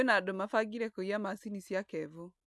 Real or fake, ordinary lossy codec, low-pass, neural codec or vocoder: real; none; none; none